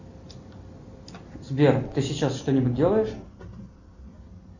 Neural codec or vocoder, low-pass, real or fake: none; 7.2 kHz; real